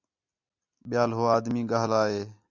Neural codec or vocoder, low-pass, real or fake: none; 7.2 kHz; real